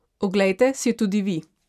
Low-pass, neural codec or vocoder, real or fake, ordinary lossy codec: 14.4 kHz; none; real; none